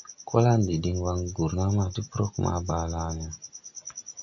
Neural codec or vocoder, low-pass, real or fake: none; 7.2 kHz; real